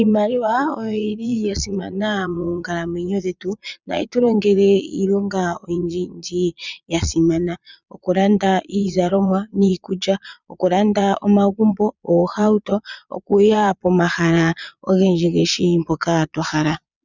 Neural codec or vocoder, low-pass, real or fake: vocoder, 22.05 kHz, 80 mel bands, Vocos; 7.2 kHz; fake